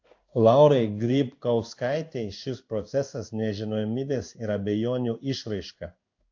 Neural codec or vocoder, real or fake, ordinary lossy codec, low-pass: codec, 16 kHz in and 24 kHz out, 1 kbps, XY-Tokenizer; fake; AAC, 48 kbps; 7.2 kHz